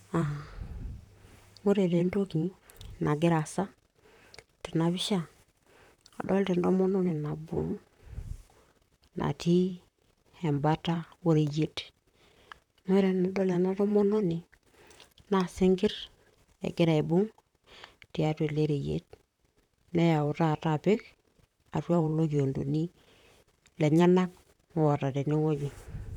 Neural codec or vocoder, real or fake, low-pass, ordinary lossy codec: vocoder, 44.1 kHz, 128 mel bands, Pupu-Vocoder; fake; 19.8 kHz; none